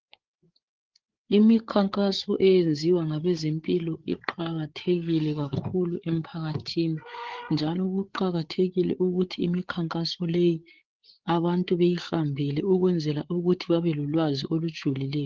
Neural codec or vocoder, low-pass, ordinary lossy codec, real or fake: codec, 16 kHz, 8 kbps, FreqCodec, larger model; 7.2 kHz; Opus, 16 kbps; fake